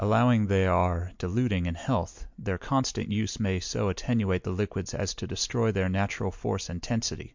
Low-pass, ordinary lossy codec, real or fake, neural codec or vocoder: 7.2 kHz; MP3, 64 kbps; real; none